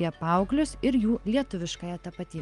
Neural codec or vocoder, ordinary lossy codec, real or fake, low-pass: none; Opus, 32 kbps; real; 10.8 kHz